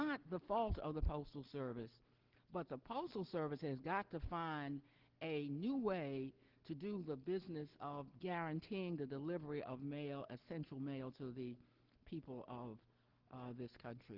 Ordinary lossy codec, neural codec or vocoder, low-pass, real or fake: Opus, 16 kbps; none; 5.4 kHz; real